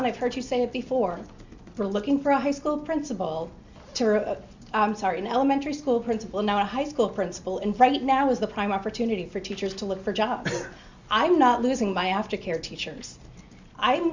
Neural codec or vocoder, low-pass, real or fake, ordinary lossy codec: none; 7.2 kHz; real; Opus, 64 kbps